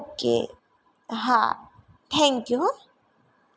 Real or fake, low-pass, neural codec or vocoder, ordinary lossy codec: real; none; none; none